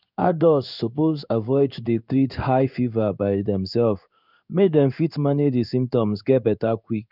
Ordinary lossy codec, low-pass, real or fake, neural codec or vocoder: none; 5.4 kHz; fake; codec, 16 kHz in and 24 kHz out, 1 kbps, XY-Tokenizer